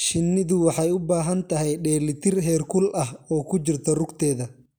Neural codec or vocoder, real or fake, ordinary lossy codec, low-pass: none; real; none; none